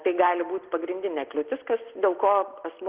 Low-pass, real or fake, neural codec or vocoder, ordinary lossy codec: 3.6 kHz; real; none; Opus, 16 kbps